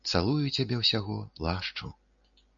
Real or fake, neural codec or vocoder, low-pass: real; none; 7.2 kHz